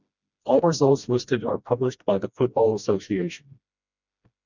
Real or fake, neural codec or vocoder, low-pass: fake; codec, 16 kHz, 1 kbps, FreqCodec, smaller model; 7.2 kHz